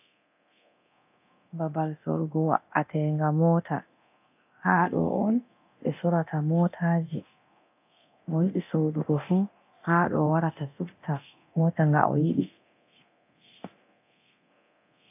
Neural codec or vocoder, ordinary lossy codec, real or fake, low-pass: codec, 24 kHz, 0.9 kbps, DualCodec; AAC, 32 kbps; fake; 3.6 kHz